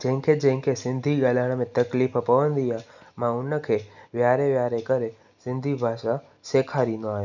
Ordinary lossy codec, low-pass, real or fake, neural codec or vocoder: none; 7.2 kHz; real; none